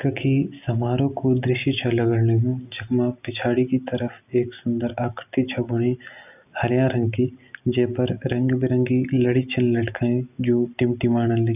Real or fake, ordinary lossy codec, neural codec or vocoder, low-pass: real; none; none; 3.6 kHz